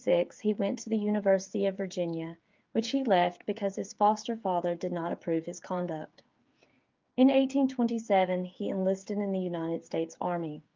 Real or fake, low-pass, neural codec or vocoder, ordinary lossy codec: fake; 7.2 kHz; codec, 16 kHz, 8 kbps, FreqCodec, smaller model; Opus, 24 kbps